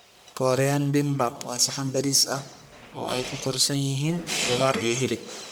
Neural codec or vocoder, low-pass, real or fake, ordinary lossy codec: codec, 44.1 kHz, 1.7 kbps, Pupu-Codec; none; fake; none